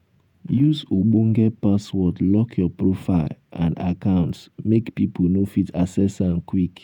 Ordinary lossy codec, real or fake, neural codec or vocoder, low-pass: none; fake; vocoder, 48 kHz, 128 mel bands, Vocos; 19.8 kHz